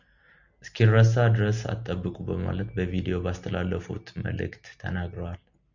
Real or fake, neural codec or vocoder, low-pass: real; none; 7.2 kHz